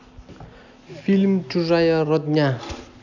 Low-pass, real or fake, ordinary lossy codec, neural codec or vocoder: 7.2 kHz; real; none; none